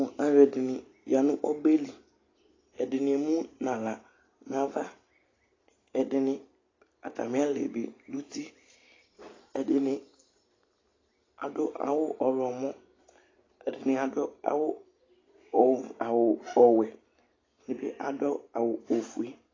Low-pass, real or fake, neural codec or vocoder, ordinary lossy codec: 7.2 kHz; real; none; AAC, 32 kbps